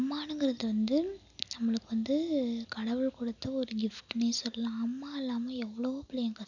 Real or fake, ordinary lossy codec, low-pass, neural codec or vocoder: real; none; 7.2 kHz; none